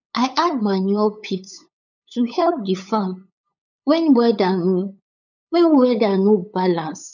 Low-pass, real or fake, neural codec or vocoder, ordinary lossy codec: 7.2 kHz; fake; codec, 16 kHz, 8 kbps, FunCodec, trained on LibriTTS, 25 frames a second; none